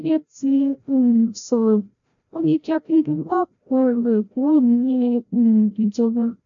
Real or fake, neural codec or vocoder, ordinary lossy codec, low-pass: fake; codec, 16 kHz, 0.5 kbps, FreqCodec, larger model; AAC, 64 kbps; 7.2 kHz